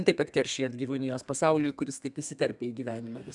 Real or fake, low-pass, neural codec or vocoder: fake; 10.8 kHz; codec, 32 kHz, 1.9 kbps, SNAC